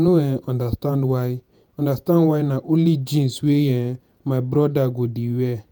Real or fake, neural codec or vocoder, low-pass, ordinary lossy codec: fake; vocoder, 48 kHz, 128 mel bands, Vocos; none; none